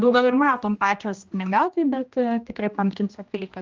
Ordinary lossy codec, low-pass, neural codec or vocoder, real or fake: Opus, 24 kbps; 7.2 kHz; codec, 16 kHz, 1 kbps, X-Codec, HuBERT features, trained on general audio; fake